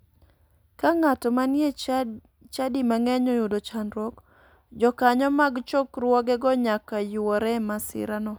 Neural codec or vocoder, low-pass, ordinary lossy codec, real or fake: none; none; none; real